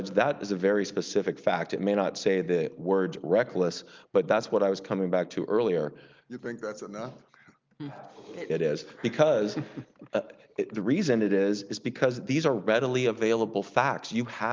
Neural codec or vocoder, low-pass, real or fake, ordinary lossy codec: none; 7.2 kHz; real; Opus, 24 kbps